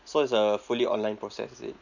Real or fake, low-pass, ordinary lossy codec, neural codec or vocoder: real; 7.2 kHz; none; none